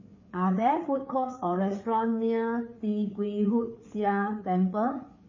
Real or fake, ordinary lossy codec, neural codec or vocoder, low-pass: fake; MP3, 32 kbps; codec, 16 kHz, 4 kbps, FreqCodec, larger model; 7.2 kHz